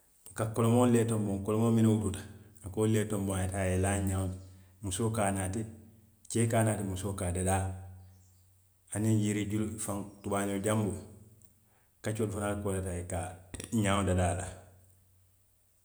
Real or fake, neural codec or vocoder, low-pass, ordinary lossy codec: real; none; none; none